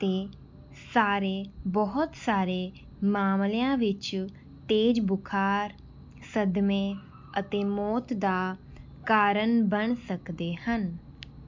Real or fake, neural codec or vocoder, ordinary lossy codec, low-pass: real; none; MP3, 64 kbps; 7.2 kHz